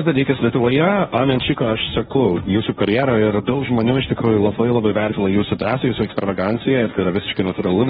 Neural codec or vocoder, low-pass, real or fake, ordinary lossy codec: codec, 16 kHz, 1.1 kbps, Voila-Tokenizer; 7.2 kHz; fake; AAC, 16 kbps